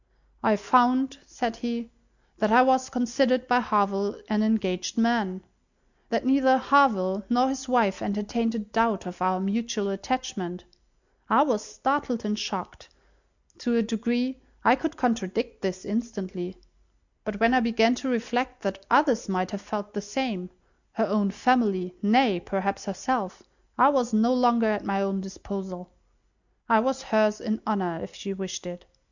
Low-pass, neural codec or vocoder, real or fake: 7.2 kHz; none; real